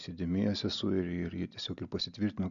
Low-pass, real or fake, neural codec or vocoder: 7.2 kHz; real; none